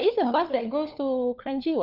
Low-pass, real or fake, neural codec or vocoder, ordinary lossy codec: 5.4 kHz; fake; codec, 16 kHz, 4 kbps, FunCodec, trained on LibriTTS, 50 frames a second; none